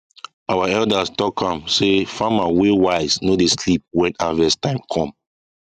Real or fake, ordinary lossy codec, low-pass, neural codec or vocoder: real; none; 14.4 kHz; none